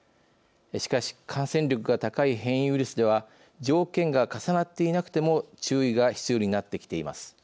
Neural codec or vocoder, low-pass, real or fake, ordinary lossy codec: none; none; real; none